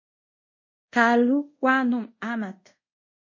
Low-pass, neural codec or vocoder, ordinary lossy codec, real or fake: 7.2 kHz; codec, 24 kHz, 0.5 kbps, DualCodec; MP3, 32 kbps; fake